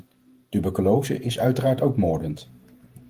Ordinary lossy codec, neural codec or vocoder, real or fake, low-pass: Opus, 24 kbps; vocoder, 48 kHz, 128 mel bands, Vocos; fake; 14.4 kHz